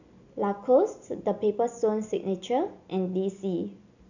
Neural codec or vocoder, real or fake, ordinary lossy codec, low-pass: none; real; none; 7.2 kHz